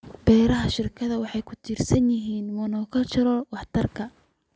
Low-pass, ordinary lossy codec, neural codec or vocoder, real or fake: none; none; none; real